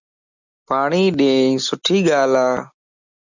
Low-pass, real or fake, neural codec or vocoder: 7.2 kHz; real; none